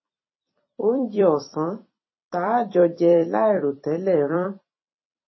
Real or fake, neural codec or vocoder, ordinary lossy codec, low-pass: fake; vocoder, 44.1 kHz, 128 mel bands every 256 samples, BigVGAN v2; MP3, 24 kbps; 7.2 kHz